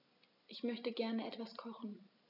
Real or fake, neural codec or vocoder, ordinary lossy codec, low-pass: real; none; none; 5.4 kHz